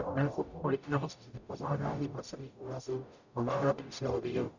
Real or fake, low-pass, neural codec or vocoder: fake; 7.2 kHz; codec, 44.1 kHz, 0.9 kbps, DAC